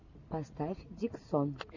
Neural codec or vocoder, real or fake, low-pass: none; real; 7.2 kHz